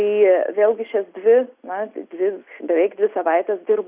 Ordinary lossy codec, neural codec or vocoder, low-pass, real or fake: Opus, 64 kbps; none; 3.6 kHz; real